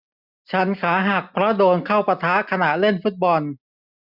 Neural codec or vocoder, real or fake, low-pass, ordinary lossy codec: none; real; 5.4 kHz; none